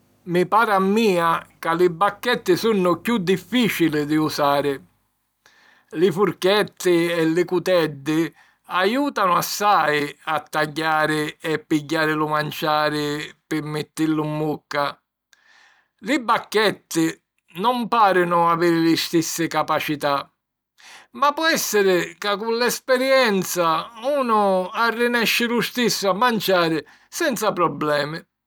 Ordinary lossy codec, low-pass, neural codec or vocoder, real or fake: none; none; none; real